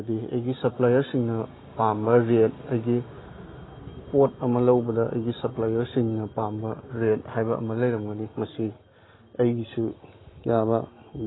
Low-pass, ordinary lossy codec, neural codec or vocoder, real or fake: 7.2 kHz; AAC, 16 kbps; none; real